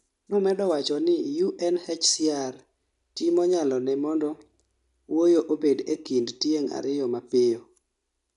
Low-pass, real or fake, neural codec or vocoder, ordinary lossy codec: 10.8 kHz; real; none; none